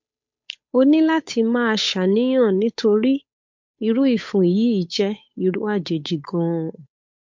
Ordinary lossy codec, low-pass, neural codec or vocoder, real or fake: MP3, 48 kbps; 7.2 kHz; codec, 16 kHz, 8 kbps, FunCodec, trained on Chinese and English, 25 frames a second; fake